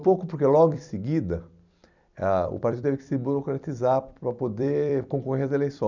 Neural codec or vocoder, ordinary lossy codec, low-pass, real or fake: vocoder, 44.1 kHz, 128 mel bands every 256 samples, BigVGAN v2; none; 7.2 kHz; fake